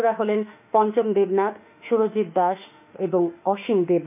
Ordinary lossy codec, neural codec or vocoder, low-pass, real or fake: none; autoencoder, 48 kHz, 32 numbers a frame, DAC-VAE, trained on Japanese speech; 3.6 kHz; fake